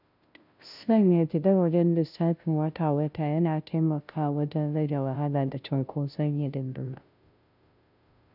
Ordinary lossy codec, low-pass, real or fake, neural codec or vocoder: none; 5.4 kHz; fake; codec, 16 kHz, 0.5 kbps, FunCodec, trained on Chinese and English, 25 frames a second